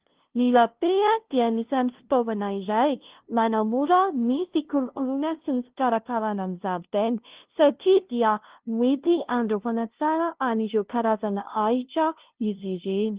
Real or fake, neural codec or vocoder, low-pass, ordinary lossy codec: fake; codec, 16 kHz, 0.5 kbps, FunCodec, trained on LibriTTS, 25 frames a second; 3.6 kHz; Opus, 16 kbps